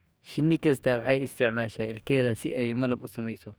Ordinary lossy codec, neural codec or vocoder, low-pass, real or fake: none; codec, 44.1 kHz, 2.6 kbps, DAC; none; fake